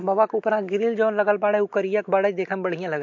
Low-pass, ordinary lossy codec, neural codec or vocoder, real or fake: 7.2 kHz; MP3, 48 kbps; none; real